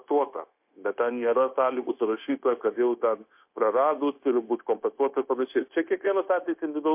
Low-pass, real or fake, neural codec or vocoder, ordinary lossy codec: 3.6 kHz; fake; codec, 16 kHz, 0.9 kbps, LongCat-Audio-Codec; MP3, 32 kbps